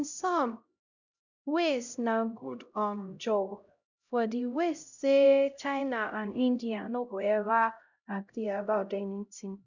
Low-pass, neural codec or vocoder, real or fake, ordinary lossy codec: 7.2 kHz; codec, 16 kHz, 0.5 kbps, X-Codec, HuBERT features, trained on LibriSpeech; fake; none